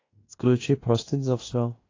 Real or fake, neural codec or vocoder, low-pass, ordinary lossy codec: fake; codec, 16 kHz in and 24 kHz out, 0.9 kbps, LongCat-Audio-Codec, fine tuned four codebook decoder; 7.2 kHz; AAC, 32 kbps